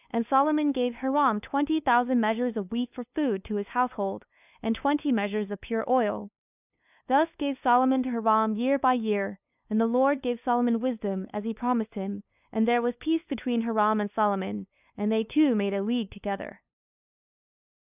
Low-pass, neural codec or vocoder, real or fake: 3.6 kHz; codec, 16 kHz, 2 kbps, FunCodec, trained on LibriTTS, 25 frames a second; fake